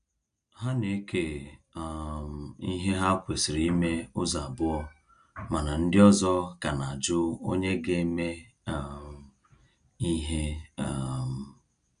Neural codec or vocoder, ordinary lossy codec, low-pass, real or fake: none; none; 9.9 kHz; real